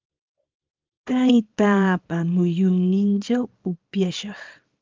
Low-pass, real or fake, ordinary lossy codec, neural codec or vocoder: 7.2 kHz; fake; Opus, 24 kbps; codec, 24 kHz, 0.9 kbps, WavTokenizer, small release